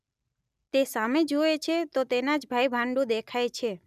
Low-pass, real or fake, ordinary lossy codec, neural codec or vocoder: 14.4 kHz; real; none; none